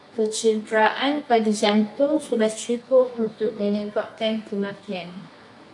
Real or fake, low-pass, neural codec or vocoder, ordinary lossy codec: fake; 10.8 kHz; codec, 24 kHz, 0.9 kbps, WavTokenizer, medium music audio release; AAC, 64 kbps